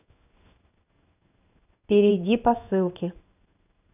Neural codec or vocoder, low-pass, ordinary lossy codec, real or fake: codec, 16 kHz in and 24 kHz out, 1 kbps, XY-Tokenizer; 3.6 kHz; none; fake